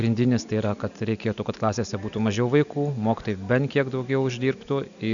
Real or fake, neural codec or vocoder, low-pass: real; none; 7.2 kHz